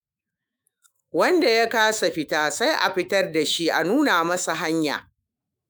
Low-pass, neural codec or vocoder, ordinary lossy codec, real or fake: none; autoencoder, 48 kHz, 128 numbers a frame, DAC-VAE, trained on Japanese speech; none; fake